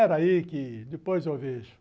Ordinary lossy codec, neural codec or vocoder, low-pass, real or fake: none; none; none; real